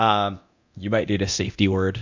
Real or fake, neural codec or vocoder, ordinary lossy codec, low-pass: fake; codec, 24 kHz, 0.9 kbps, DualCodec; MP3, 64 kbps; 7.2 kHz